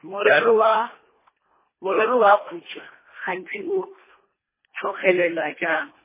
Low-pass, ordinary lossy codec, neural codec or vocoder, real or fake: 3.6 kHz; MP3, 16 kbps; codec, 24 kHz, 1.5 kbps, HILCodec; fake